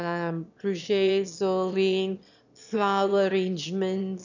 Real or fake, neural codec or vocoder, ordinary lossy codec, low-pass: fake; autoencoder, 22.05 kHz, a latent of 192 numbers a frame, VITS, trained on one speaker; none; 7.2 kHz